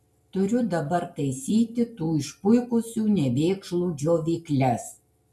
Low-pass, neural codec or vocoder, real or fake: 14.4 kHz; none; real